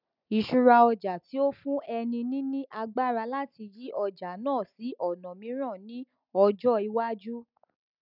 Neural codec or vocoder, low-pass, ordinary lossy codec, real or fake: none; 5.4 kHz; none; real